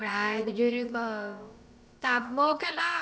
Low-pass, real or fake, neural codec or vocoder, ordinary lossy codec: none; fake; codec, 16 kHz, about 1 kbps, DyCAST, with the encoder's durations; none